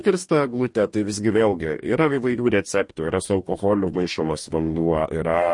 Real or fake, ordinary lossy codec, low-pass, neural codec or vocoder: fake; MP3, 48 kbps; 10.8 kHz; codec, 44.1 kHz, 2.6 kbps, DAC